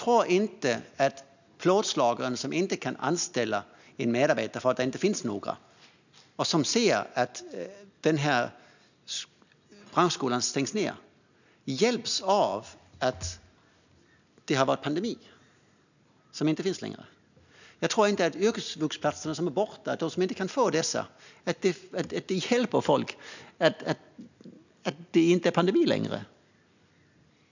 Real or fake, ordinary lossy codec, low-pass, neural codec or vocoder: real; none; 7.2 kHz; none